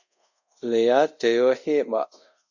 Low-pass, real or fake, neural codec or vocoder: 7.2 kHz; fake; codec, 24 kHz, 0.5 kbps, DualCodec